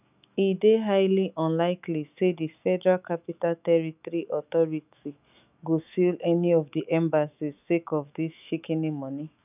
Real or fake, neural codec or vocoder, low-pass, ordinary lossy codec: fake; autoencoder, 48 kHz, 128 numbers a frame, DAC-VAE, trained on Japanese speech; 3.6 kHz; none